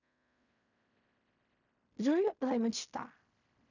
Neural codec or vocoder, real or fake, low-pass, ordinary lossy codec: codec, 16 kHz in and 24 kHz out, 0.4 kbps, LongCat-Audio-Codec, fine tuned four codebook decoder; fake; 7.2 kHz; none